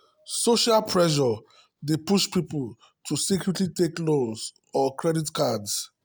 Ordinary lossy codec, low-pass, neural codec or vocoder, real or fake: none; none; none; real